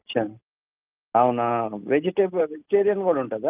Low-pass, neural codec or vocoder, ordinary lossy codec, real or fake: 3.6 kHz; none; Opus, 24 kbps; real